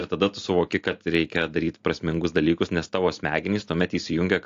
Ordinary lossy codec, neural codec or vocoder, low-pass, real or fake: AAC, 48 kbps; none; 7.2 kHz; real